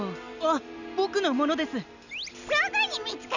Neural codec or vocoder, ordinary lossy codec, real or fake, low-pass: none; none; real; 7.2 kHz